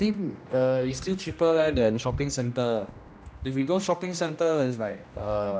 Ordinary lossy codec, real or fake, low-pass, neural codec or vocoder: none; fake; none; codec, 16 kHz, 1 kbps, X-Codec, HuBERT features, trained on general audio